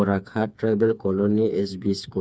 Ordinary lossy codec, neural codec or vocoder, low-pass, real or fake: none; codec, 16 kHz, 4 kbps, FreqCodec, smaller model; none; fake